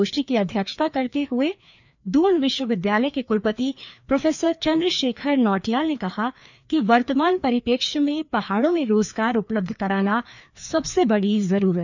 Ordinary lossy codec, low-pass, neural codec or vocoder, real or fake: none; 7.2 kHz; codec, 16 kHz, 2 kbps, FreqCodec, larger model; fake